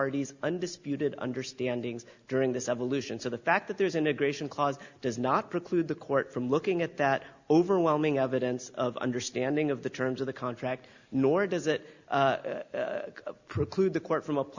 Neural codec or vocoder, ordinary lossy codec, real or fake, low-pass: none; Opus, 64 kbps; real; 7.2 kHz